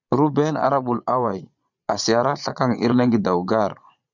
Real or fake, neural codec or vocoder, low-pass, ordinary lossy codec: fake; vocoder, 22.05 kHz, 80 mel bands, Vocos; 7.2 kHz; MP3, 64 kbps